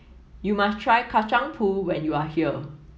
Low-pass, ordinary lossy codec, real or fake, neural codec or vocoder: none; none; real; none